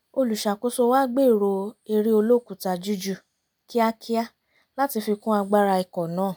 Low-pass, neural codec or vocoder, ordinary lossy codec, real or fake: none; none; none; real